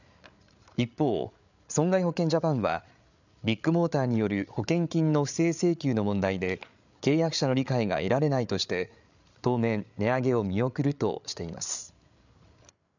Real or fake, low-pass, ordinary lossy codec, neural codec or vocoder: fake; 7.2 kHz; none; codec, 16 kHz, 8 kbps, FreqCodec, larger model